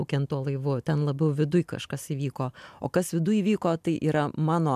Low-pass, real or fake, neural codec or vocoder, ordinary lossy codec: 14.4 kHz; real; none; MP3, 96 kbps